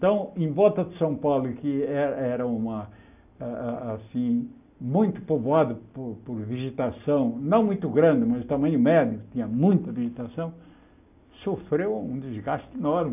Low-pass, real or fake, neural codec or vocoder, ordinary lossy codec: 3.6 kHz; real; none; none